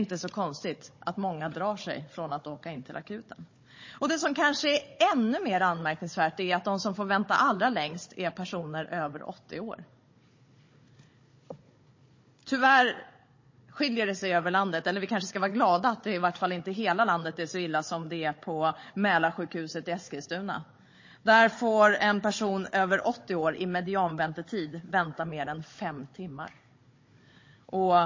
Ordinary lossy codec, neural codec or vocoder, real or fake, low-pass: MP3, 32 kbps; codec, 16 kHz, 16 kbps, FunCodec, trained on Chinese and English, 50 frames a second; fake; 7.2 kHz